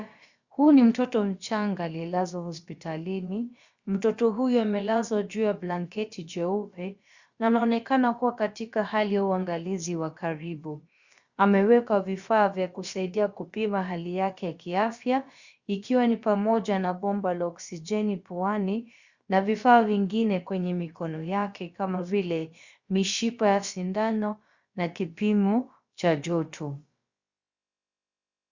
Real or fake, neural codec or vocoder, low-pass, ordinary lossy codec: fake; codec, 16 kHz, about 1 kbps, DyCAST, with the encoder's durations; 7.2 kHz; Opus, 64 kbps